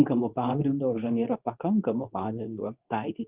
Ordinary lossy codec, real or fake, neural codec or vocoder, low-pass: Opus, 32 kbps; fake; codec, 24 kHz, 0.9 kbps, WavTokenizer, medium speech release version 2; 3.6 kHz